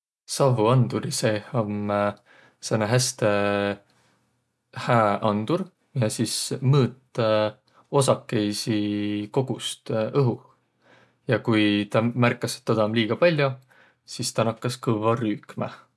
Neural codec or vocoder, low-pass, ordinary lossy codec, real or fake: none; none; none; real